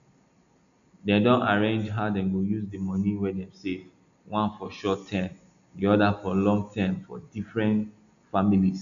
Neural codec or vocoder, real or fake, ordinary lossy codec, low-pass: none; real; none; 7.2 kHz